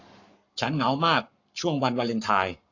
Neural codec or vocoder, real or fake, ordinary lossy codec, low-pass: codec, 44.1 kHz, 7.8 kbps, Pupu-Codec; fake; AAC, 48 kbps; 7.2 kHz